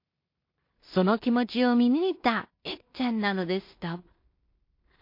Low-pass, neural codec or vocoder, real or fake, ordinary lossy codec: 5.4 kHz; codec, 16 kHz in and 24 kHz out, 0.4 kbps, LongCat-Audio-Codec, two codebook decoder; fake; MP3, 32 kbps